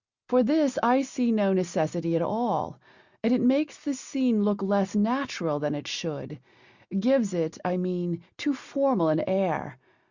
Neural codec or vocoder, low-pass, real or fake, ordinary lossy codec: none; 7.2 kHz; real; Opus, 64 kbps